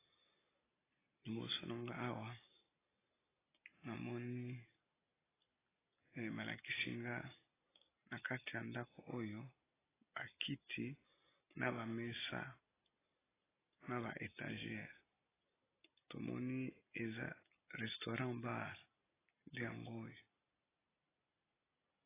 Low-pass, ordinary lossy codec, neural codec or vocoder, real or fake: 3.6 kHz; AAC, 16 kbps; none; real